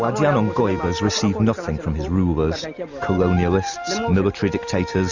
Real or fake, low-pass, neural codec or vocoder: real; 7.2 kHz; none